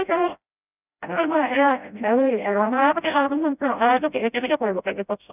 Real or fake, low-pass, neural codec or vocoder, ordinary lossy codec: fake; 3.6 kHz; codec, 16 kHz, 0.5 kbps, FreqCodec, smaller model; none